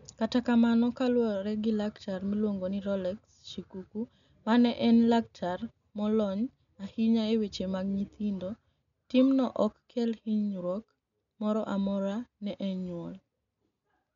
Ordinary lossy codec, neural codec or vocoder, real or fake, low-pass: none; none; real; 7.2 kHz